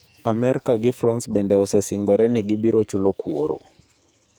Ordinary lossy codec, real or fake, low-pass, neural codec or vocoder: none; fake; none; codec, 44.1 kHz, 2.6 kbps, SNAC